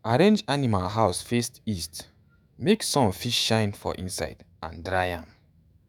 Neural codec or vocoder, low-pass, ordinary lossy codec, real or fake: autoencoder, 48 kHz, 128 numbers a frame, DAC-VAE, trained on Japanese speech; none; none; fake